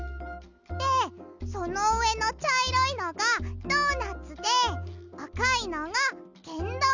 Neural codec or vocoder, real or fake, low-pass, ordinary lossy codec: none; real; 7.2 kHz; none